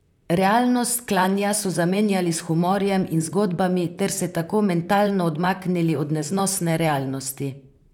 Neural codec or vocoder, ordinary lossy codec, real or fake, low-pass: vocoder, 44.1 kHz, 128 mel bands, Pupu-Vocoder; none; fake; 19.8 kHz